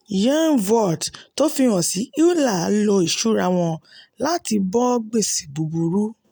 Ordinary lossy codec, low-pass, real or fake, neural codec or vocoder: none; none; real; none